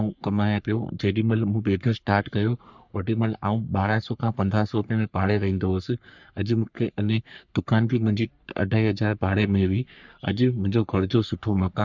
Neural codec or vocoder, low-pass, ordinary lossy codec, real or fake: codec, 44.1 kHz, 3.4 kbps, Pupu-Codec; 7.2 kHz; none; fake